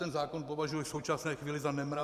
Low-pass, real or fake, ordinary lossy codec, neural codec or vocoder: 14.4 kHz; fake; Opus, 64 kbps; vocoder, 44.1 kHz, 128 mel bands every 512 samples, BigVGAN v2